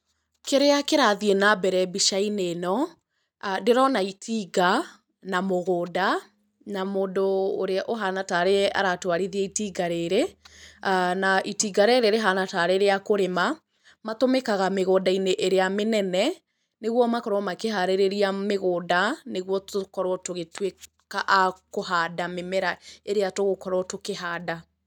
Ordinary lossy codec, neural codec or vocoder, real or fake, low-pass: none; none; real; 19.8 kHz